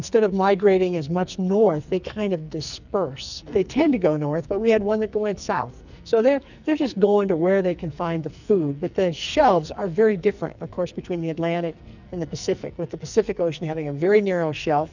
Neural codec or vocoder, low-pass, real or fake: codec, 44.1 kHz, 2.6 kbps, SNAC; 7.2 kHz; fake